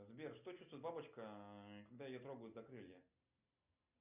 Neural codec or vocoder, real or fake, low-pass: none; real; 3.6 kHz